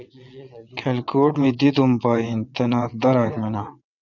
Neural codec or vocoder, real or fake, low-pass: vocoder, 22.05 kHz, 80 mel bands, WaveNeXt; fake; 7.2 kHz